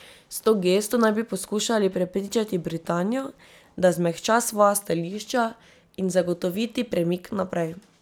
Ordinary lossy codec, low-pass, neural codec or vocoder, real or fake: none; none; none; real